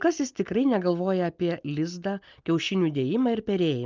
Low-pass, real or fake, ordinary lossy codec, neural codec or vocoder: 7.2 kHz; real; Opus, 32 kbps; none